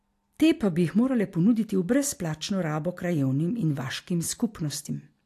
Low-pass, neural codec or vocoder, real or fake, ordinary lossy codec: 14.4 kHz; none; real; AAC, 64 kbps